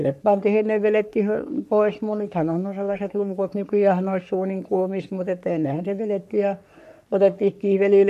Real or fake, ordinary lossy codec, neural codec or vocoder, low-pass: fake; AAC, 96 kbps; codec, 44.1 kHz, 3.4 kbps, Pupu-Codec; 14.4 kHz